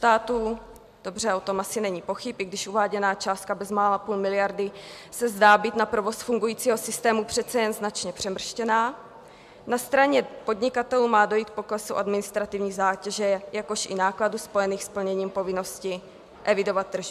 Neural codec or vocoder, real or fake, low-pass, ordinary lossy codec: none; real; 14.4 kHz; MP3, 96 kbps